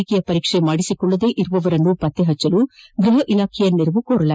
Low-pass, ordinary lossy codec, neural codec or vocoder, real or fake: none; none; none; real